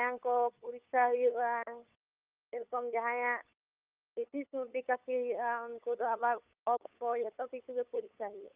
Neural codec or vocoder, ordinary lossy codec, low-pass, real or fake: codec, 16 kHz, 8 kbps, FunCodec, trained on LibriTTS, 25 frames a second; Opus, 32 kbps; 3.6 kHz; fake